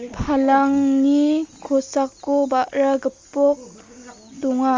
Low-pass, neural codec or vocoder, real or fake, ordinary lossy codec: 7.2 kHz; none; real; Opus, 32 kbps